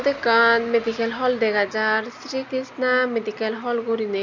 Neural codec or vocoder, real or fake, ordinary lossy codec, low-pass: none; real; none; 7.2 kHz